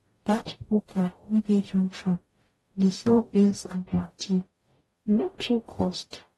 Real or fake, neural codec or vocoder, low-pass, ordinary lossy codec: fake; codec, 44.1 kHz, 0.9 kbps, DAC; 19.8 kHz; AAC, 32 kbps